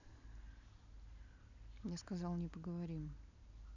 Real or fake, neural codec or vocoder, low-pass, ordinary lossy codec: real; none; 7.2 kHz; none